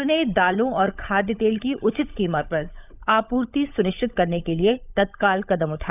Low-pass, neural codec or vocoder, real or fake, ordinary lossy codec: 3.6 kHz; codec, 16 kHz, 16 kbps, FunCodec, trained on LibriTTS, 50 frames a second; fake; none